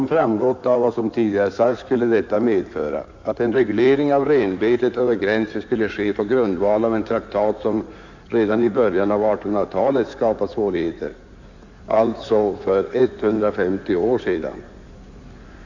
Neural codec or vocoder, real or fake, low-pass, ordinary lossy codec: codec, 16 kHz in and 24 kHz out, 2.2 kbps, FireRedTTS-2 codec; fake; 7.2 kHz; none